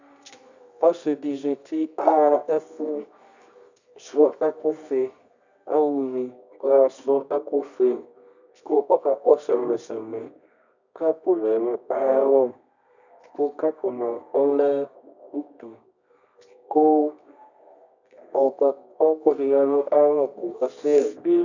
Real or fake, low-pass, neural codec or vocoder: fake; 7.2 kHz; codec, 24 kHz, 0.9 kbps, WavTokenizer, medium music audio release